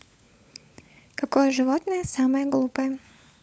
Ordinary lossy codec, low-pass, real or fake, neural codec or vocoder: none; none; fake; codec, 16 kHz, 16 kbps, FunCodec, trained on LibriTTS, 50 frames a second